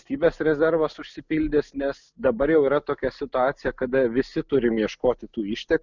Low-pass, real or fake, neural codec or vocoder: 7.2 kHz; real; none